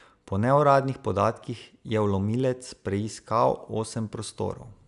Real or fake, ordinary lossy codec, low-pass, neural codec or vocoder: real; none; 10.8 kHz; none